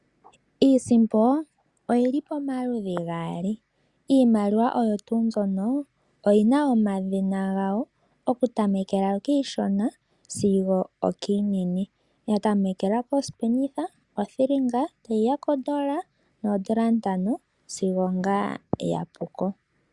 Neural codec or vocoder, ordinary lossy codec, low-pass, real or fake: none; Opus, 64 kbps; 10.8 kHz; real